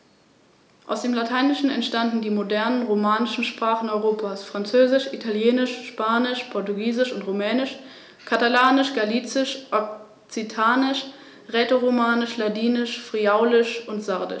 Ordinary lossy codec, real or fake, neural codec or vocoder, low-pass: none; real; none; none